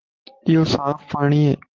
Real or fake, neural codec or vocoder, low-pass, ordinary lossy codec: real; none; 7.2 kHz; Opus, 16 kbps